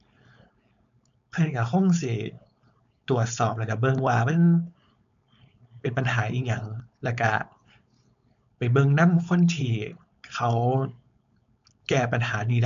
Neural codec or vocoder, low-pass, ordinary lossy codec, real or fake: codec, 16 kHz, 4.8 kbps, FACodec; 7.2 kHz; AAC, 96 kbps; fake